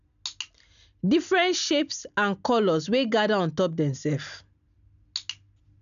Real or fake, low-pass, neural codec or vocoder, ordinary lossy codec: real; 7.2 kHz; none; none